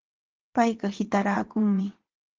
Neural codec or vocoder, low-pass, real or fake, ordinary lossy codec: vocoder, 22.05 kHz, 80 mel bands, WaveNeXt; 7.2 kHz; fake; Opus, 16 kbps